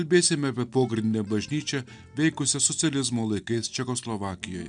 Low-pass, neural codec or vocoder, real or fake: 9.9 kHz; none; real